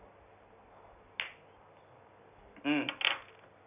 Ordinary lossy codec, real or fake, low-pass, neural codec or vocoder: none; real; 3.6 kHz; none